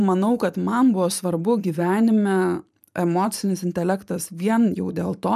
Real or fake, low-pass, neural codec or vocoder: fake; 14.4 kHz; vocoder, 44.1 kHz, 128 mel bands every 512 samples, BigVGAN v2